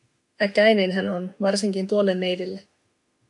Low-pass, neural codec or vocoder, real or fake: 10.8 kHz; autoencoder, 48 kHz, 32 numbers a frame, DAC-VAE, trained on Japanese speech; fake